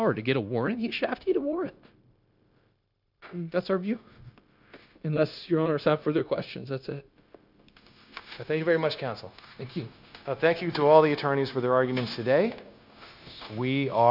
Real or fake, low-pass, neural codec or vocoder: fake; 5.4 kHz; codec, 16 kHz, 0.9 kbps, LongCat-Audio-Codec